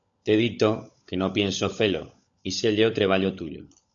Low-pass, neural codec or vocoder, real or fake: 7.2 kHz; codec, 16 kHz, 16 kbps, FunCodec, trained on LibriTTS, 50 frames a second; fake